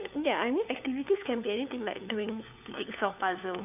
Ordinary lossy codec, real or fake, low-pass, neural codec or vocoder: none; fake; 3.6 kHz; codec, 16 kHz, 4 kbps, FunCodec, trained on LibriTTS, 50 frames a second